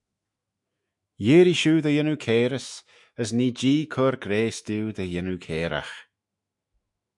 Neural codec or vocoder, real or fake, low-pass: autoencoder, 48 kHz, 128 numbers a frame, DAC-VAE, trained on Japanese speech; fake; 10.8 kHz